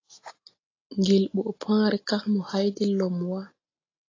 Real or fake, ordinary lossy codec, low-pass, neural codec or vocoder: real; AAC, 32 kbps; 7.2 kHz; none